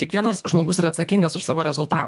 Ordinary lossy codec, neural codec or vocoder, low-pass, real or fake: AAC, 96 kbps; codec, 24 kHz, 1.5 kbps, HILCodec; 10.8 kHz; fake